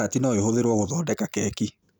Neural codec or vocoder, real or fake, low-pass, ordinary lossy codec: none; real; none; none